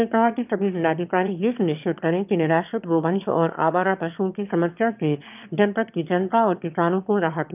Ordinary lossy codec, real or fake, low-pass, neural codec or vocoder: none; fake; 3.6 kHz; autoencoder, 22.05 kHz, a latent of 192 numbers a frame, VITS, trained on one speaker